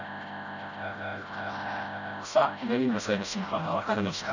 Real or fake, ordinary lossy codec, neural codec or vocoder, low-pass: fake; none; codec, 16 kHz, 0.5 kbps, FreqCodec, smaller model; 7.2 kHz